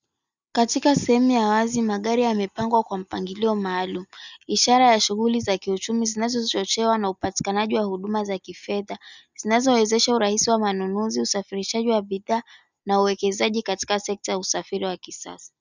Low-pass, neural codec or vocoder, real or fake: 7.2 kHz; none; real